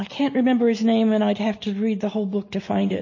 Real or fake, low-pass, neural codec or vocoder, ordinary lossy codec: real; 7.2 kHz; none; MP3, 32 kbps